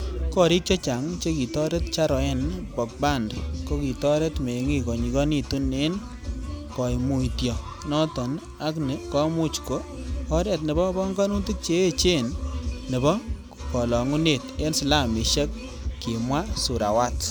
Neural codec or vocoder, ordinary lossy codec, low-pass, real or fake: none; none; none; real